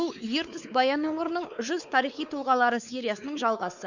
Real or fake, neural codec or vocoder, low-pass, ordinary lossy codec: fake; codec, 16 kHz, 4 kbps, X-Codec, WavLM features, trained on Multilingual LibriSpeech; 7.2 kHz; none